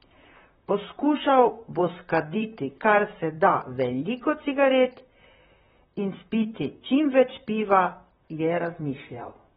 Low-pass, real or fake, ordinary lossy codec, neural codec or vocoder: 19.8 kHz; fake; AAC, 16 kbps; vocoder, 44.1 kHz, 128 mel bands, Pupu-Vocoder